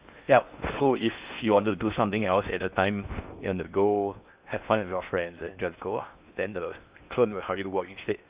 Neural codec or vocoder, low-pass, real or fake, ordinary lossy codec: codec, 16 kHz in and 24 kHz out, 0.6 kbps, FocalCodec, streaming, 4096 codes; 3.6 kHz; fake; Opus, 64 kbps